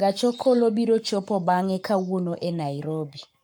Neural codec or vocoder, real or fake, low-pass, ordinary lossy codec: codec, 44.1 kHz, 7.8 kbps, Pupu-Codec; fake; 19.8 kHz; none